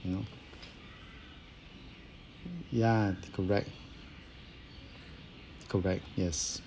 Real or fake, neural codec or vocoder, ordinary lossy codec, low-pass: real; none; none; none